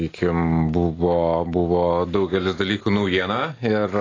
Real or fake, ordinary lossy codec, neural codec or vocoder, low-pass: real; AAC, 32 kbps; none; 7.2 kHz